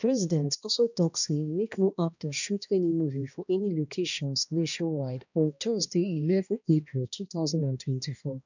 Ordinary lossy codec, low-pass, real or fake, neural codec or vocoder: none; 7.2 kHz; fake; codec, 16 kHz, 1 kbps, X-Codec, HuBERT features, trained on balanced general audio